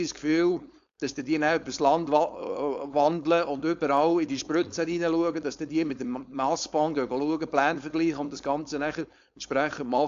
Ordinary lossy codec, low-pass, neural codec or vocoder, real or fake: AAC, 64 kbps; 7.2 kHz; codec, 16 kHz, 4.8 kbps, FACodec; fake